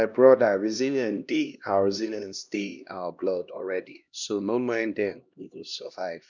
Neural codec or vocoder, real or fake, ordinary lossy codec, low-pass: codec, 16 kHz, 1 kbps, X-Codec, HuBERT features, trained on LibriSpeech; fake; none; 7.2 kHz